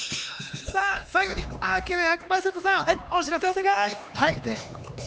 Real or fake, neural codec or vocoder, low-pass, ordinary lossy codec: fake; codec, 16 kHz, 2 kbps, X-Codec, HuBERT features, trained on LibriSpeech; none; none